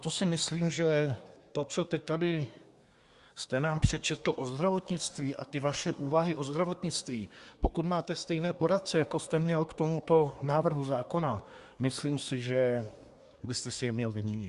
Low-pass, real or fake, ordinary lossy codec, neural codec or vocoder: 10.8 kHz; fake; Opus, 64 kbps; codec, 24 kHz, 1 kbps, SNAC